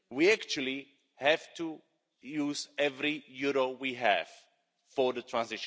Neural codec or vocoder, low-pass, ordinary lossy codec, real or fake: none; none; none; real